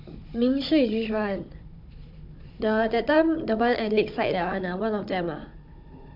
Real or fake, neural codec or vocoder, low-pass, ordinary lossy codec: fake; codec, 16 kHz, 16 kbps, FunCodec, trained on Chinese and English, 50 frames a second; 5.4 kHz; MP3, 48 kbps